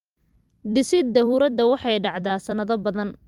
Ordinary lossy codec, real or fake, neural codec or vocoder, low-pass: Opus, 32 kbps; fake; vocoder, 44.1 kHz, 128 mel bands every 256 samples, BigVGAN v2; 19.8 kHz